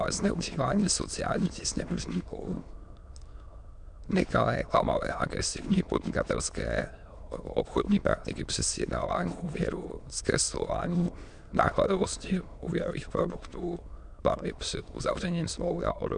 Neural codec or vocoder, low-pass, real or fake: autoencoder, 22.05 kHz, a latent of 192 numbers a frame, VITS, trained on many speakers; 9.9 kHz; fake